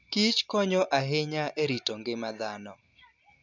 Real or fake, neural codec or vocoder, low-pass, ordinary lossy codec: real; none; 7.2 kHz; none